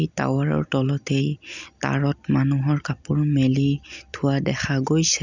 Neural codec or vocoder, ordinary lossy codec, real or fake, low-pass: none; none; real; 7.2 kHz